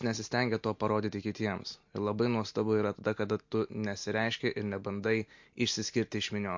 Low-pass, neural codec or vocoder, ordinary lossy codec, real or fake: 7.2 kHz; none; MP3, 48 kbps; real